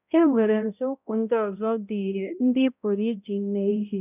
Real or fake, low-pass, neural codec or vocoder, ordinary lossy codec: fake; 3.6 kHz; codec, 16 kHz, 0.5 kbps, X-Codec, HuBERT features, trained on balanced general audio; none